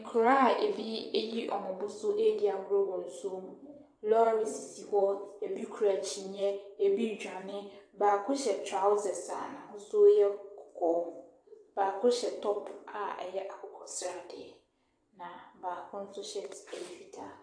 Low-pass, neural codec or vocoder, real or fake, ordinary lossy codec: 9.9 kHz; vocoder, 22.05 kHz, 80 mel bands, WaveNeXt; fake; AAC, 64 kbps